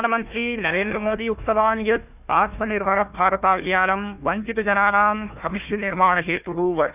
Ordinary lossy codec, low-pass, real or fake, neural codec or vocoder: none; 3.6 kHz; fake; codec, 16 kHz, 1 kbps, FunCodec, trained on Chinese and English, 50 frames a second